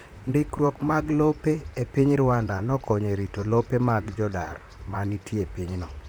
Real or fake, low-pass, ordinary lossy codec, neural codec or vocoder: fake; none; none; vocoder, 44.1 kHz, 128 mel bands, Pupu-Vocoder